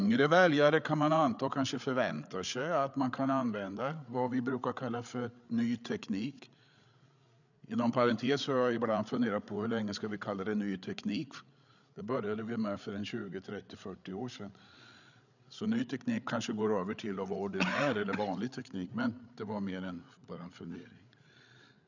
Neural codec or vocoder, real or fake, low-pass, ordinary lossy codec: codec, 16 kHz, 8 kbps, FreqCodec, larger model; fake; 7.2 kHz; none